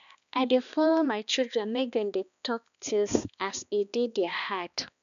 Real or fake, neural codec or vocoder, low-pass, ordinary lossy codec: fake; codec, 16 kHz, 2 kbps, X-Codec, HuBERT features, trained on balanced general audio; 7.2 kHz; none